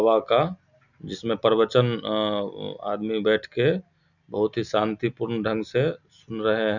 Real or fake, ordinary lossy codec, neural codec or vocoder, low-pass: real; none; none; 7.2 kHz